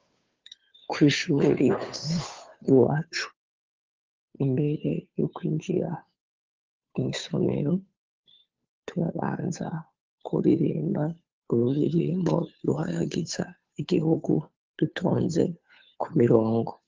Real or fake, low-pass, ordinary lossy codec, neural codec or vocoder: fake; 7.2 kHz; Opus, 24 kbps; codec, 16 kHz, 2 kbps, FunCodec, trained on Chinese and English, 25 frames a second